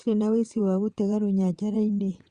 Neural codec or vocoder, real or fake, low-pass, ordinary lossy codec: vocoder, 22.05 kHz, 80 mel bands, WaveNeXt; fake; 9.9 kHz; Opus, 64 kbps